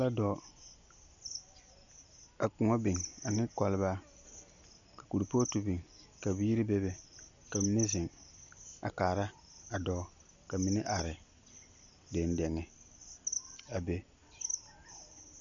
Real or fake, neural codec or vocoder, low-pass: real; none; 7.2 kHz